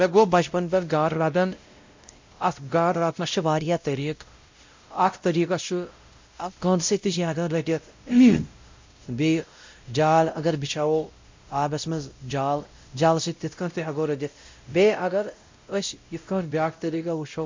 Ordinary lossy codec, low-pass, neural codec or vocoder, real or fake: MP3, 48 kbps; 7.2 kHz; codec, 16 kHz, 0.5 kbps, X-Codec, WavLM features, trained on Multilingual LibriSpeech; fake